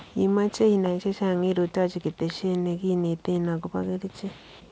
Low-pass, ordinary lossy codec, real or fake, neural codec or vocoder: none; none; real; none